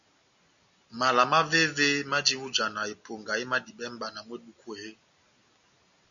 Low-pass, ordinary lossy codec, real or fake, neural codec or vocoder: 7.2 kHz; MP3, 96 kbps; real; none